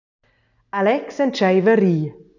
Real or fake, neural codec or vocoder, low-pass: real; none; 7.2 kHz